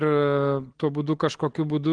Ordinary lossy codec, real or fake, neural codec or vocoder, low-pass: Opus, 16 kbps; real; none; 9.9 kHz